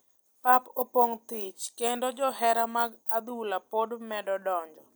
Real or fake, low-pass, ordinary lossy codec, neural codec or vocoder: real; none; none; none